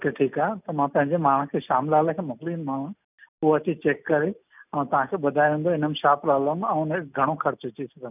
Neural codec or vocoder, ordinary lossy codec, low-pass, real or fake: none; none; 3.6 kHz; real